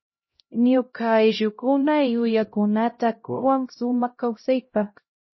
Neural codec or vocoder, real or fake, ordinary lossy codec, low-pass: codec, 16 kHz, 0.5 kbps, X-Codec, HuBERT features, trained on LibriSpeech; fake; MP3, 24 kbps; 7.2 kHz